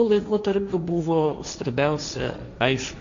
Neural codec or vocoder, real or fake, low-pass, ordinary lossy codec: codec, 16 kHz, 1.1 kbps, Voila-Tokenizer; fake; 7.2 kHz; MP3, 64 kbps